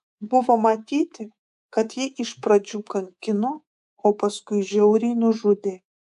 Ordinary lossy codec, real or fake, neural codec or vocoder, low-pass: AAC, 96 kbps; fake; codec, 24 kHz, 3.1 kbps, DualCodec; 10.8 kHz